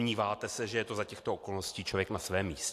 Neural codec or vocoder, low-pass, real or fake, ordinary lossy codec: none; 14.4 kHz; real; AAC, 64 kbps